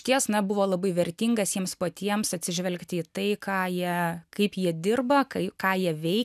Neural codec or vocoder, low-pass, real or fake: none; 14.4 kHz; real